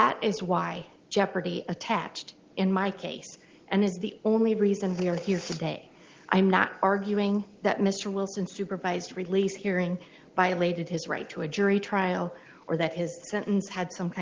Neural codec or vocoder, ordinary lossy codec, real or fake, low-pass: none; Opus, 16 kbps; real; 7.2 kHz